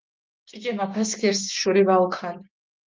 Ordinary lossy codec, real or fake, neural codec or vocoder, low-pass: Opus, 32 kbps; fake; codec, 16 kHz, 6 kbps, DAC; 7.2 kHz